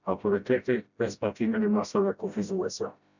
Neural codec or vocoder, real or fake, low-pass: codec, 16 kHz, 0.5 kbps, FreqCodec, smaller model; fake; 7.2 kHz